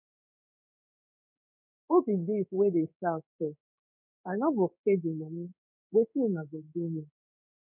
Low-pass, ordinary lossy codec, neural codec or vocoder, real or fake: 3.6 kHz; none; codec, 16 kHz in and 24 kHz out, 1 kbps, XY-Tokenizer; fake